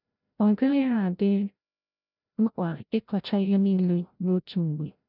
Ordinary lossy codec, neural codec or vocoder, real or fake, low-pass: none; codec, 16 kHz, 0.5 kbps, FreqCodec, larger model; fake; 5.4 kHz